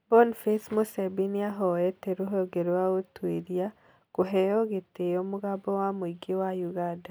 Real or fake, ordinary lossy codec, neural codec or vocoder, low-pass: real; none; none; none